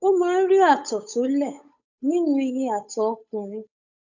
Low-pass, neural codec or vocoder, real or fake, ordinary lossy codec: 7.2 kHz; codec, 16 kHz, 8 kbps, FunCodec, trained on Chinese and English, 25 frames a second; fake; none